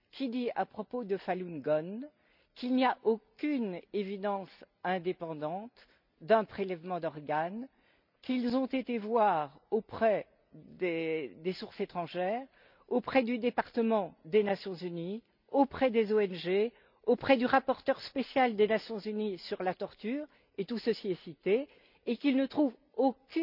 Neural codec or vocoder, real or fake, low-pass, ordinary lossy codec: none; real; 5.4 kHz; none